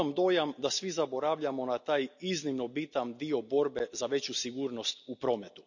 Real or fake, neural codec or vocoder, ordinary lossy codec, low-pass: real; none; none; 7.2 kHz